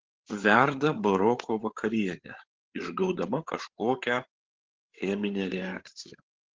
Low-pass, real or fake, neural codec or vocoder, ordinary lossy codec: 7.2 kHz; fake; codec, 16 kHz, 8 kbps, FreqCodec, larger model; Opus, 16 kbps